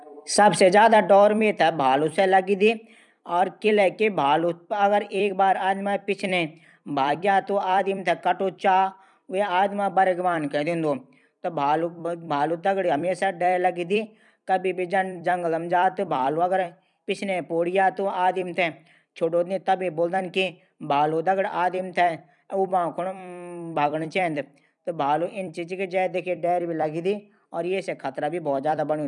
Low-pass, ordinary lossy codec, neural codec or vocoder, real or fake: 10.8 kHz; none; vocoder, 44.1 kHz, 128 mel bands every 256 samples, BigVGAN v2; fake